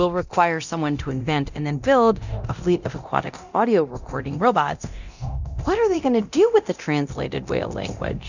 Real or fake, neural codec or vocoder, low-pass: fake; codec, 24 kHz, 0.9 kbps, DualCodec; 7.2 kHz